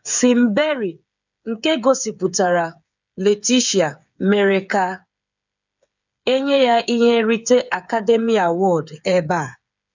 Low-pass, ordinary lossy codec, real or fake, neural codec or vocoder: 7.2 kHz; none; fake; codec, 16 kHz, 8 kbps, FreqCodec, smaller model